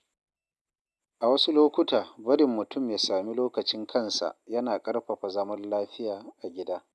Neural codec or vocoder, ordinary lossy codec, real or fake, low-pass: none; none; real; none